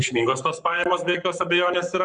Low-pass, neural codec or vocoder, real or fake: 10.8 kHz; codec, 44.1 kHz, 7.8 kbps, Pupu-Codec; fake